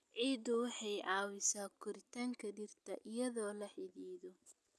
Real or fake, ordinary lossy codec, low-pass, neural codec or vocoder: real; none; none; none